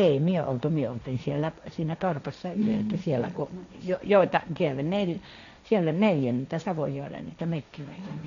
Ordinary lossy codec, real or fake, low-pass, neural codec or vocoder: none; fake; 7.2 kHz; codec, 16 kHz, 1.1 kbps, Voila-Tokenizer